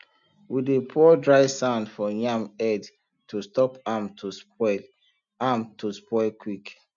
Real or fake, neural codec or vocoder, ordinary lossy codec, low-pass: real; none; none; 7.2 kHz